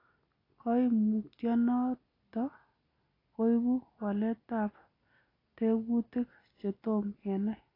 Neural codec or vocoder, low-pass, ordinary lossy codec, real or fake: none; 5.4 kHz; AAC, 24 kbps; real